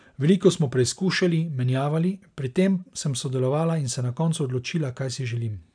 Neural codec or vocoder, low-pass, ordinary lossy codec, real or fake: none; 9.9 kHz; none; real